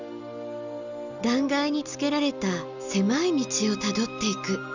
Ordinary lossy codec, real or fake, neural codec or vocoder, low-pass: none; real; none; 7.2 kHz